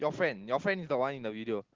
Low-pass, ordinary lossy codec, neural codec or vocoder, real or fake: 7.2 kHz; Opus, 16 kbps; none; real